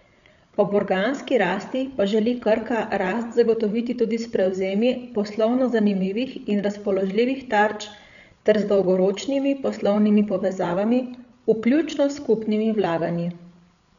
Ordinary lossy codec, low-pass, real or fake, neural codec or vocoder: none; 7.2 kHz; fake; codec, 16 kHz, 8 kbps, FreqCodec, larger model